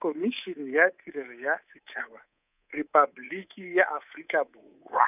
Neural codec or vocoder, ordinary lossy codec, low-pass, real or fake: codec, 24 kHz, 3.1 kbps, DualCodec; none; 3.6 kHz; fake